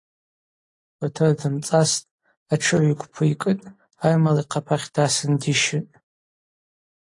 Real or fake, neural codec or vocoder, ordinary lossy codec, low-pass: real; none; AAC, 48 kbps; 10.8 kHz